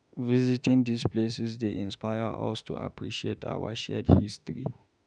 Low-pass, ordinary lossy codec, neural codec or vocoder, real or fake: 9.9 kHz; none; autoencoder, 48 kHz, 32 numbers a frame, DAC-VAE, trained on Japanese speech; fake